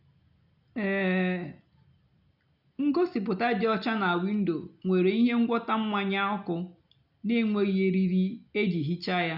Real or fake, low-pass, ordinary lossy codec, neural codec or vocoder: real; 5.4 kHz; none; none